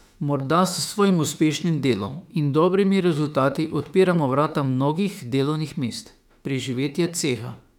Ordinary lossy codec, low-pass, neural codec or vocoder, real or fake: none; 19.8 kHz; autoencoder, 48 kHz, 32 numbers a frame, DAC-VAE, trained on Japanese speech; fake